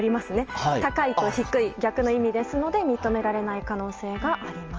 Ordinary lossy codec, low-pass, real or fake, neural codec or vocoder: Opus, 24 kbps; 7.2 kHz; real; none